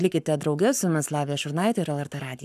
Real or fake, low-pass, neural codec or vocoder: fake; 14.4 kHz; codec, 44.1 kHz, 7.8 kbps, Pupu-Codec